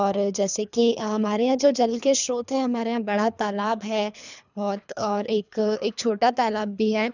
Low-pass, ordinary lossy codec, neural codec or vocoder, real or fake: 7.2 kHz; none; codec, 24 kHz, 3 kbps, HILCodec; fake